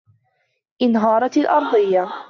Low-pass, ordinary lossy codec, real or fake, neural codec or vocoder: 7.2 kHz; AAC, 48 kbps; real; none